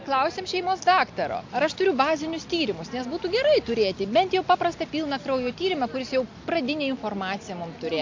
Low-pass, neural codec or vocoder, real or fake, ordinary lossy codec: 7.2 kHz; none; real; MP3, 48 kbps